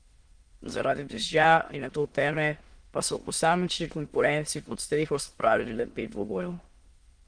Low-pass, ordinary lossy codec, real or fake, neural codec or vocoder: 9.9 kHz; Opus, 24 kbps; fake; autoencoder, 22.05 kHz, a latent of 192 numbers a frame, VITS, trained on many speakers